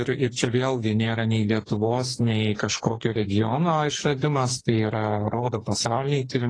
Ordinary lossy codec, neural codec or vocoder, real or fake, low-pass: AAC, 32 kbps; codec, 44.1 kHz, 2.6 kbps, SNAC; fake; 9.9 kHz